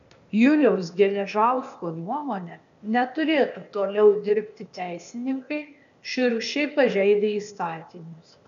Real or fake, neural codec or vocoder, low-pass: fake; codec, 16 kHz, 0.8 kbps, ZipCodec; 7.2 kHz